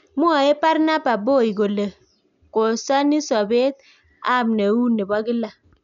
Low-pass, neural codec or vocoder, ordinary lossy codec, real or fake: 7.2 kHz; none; none; real